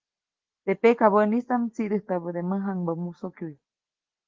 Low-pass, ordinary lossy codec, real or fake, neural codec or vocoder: 7.2 kHz; Opus, 16 kbps; real; none